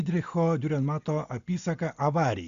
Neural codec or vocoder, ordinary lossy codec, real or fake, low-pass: none; Opus, 64 kbps; real; 7.2 kHz